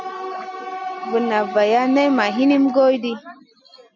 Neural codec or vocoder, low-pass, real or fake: none; 7.2 kHz; real